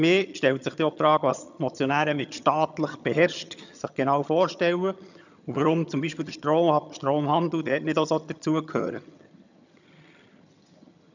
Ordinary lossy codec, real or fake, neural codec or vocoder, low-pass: none; fake; vocoder, 22.05 kHz, 80 mel bands, HiFi-GAN; 7.2 kHz